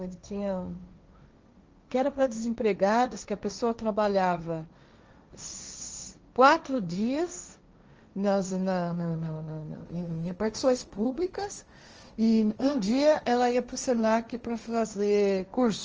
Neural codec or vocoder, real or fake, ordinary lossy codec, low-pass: codec, 16 kHz, 1.1 kbps, Voila-Tokenizer; fake; Opus, 24 kbps; 7.2 kHz